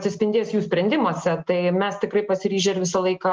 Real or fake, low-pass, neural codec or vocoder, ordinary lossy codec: real; 7.2 kHz; none; Opus, 32 kbps